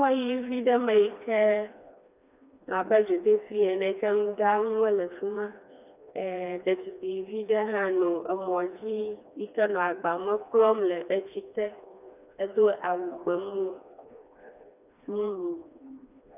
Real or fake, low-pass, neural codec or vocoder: fake; 3.6 kHz; codec, 16 kHz, 2 kbps, FreqCodec, smaller model